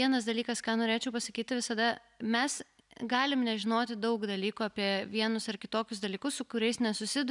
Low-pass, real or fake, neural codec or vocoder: 10.8 kHz; real; none